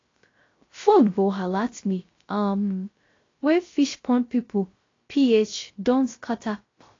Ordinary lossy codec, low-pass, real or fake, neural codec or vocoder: AAC, 32 kbps; 7.2 kHz; fake; codec, 16 kHz, 0.3 kbps, FocalCodec